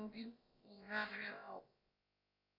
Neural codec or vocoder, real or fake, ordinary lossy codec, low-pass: codec, 16 kHz, about 1 kbps, DyCAST, with the encoder's durations; fake; MP3, 32 kbps; 5.4 kHz